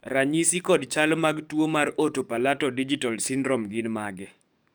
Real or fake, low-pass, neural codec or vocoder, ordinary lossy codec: fake; none; codec, 44.1 kHz, 7.8 kbps, DAC; none